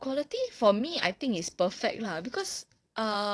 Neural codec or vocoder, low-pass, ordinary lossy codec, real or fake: vocoder, 22.05 kHz, 80 mel bands, WaveNeXt; none; none; fake